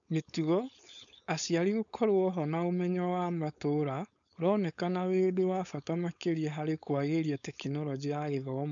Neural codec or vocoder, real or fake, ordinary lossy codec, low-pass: codec, 16 kHz, 4.8 kbps, FACodec; fake; none; 7.2 kHz